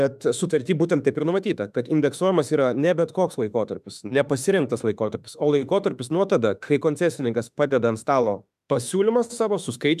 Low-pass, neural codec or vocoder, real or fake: 14.4 kHz; autoencoder, 48 kHz, 32 numbers a frame, DAC-VAE, trained on Japanese speech; fake